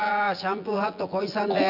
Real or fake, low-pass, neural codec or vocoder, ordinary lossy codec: fake; 5.4 kHz; vocoder, 24 kHz, 100 mel bands, Vocos; none